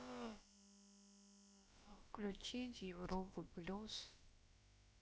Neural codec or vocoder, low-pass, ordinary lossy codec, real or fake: codec, 16 kHz, about 1 kbps, DyCAST, with the encoder's durations; none; none; fake